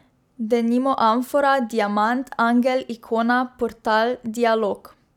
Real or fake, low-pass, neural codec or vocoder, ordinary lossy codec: real; 19.8 kHz; none; none